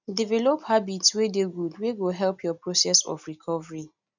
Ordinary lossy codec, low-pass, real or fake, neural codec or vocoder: none; 7.2 kHz; real; none